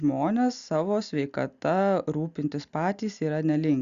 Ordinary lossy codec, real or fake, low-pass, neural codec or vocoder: Opus, 64 kbps; real; 7.2 kHz; none